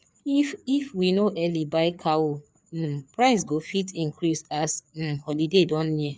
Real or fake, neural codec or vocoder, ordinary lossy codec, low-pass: fake; codec, 16 kHz, 4 kbps, FreqCodec, larger model; none; none